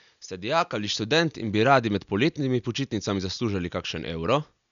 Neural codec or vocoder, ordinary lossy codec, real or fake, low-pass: none; none; real; 7.2 kHz